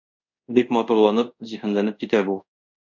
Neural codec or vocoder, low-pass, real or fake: codec, 24 kHz, 0.5 kbps, DualCodec; 7.2 kHz; fake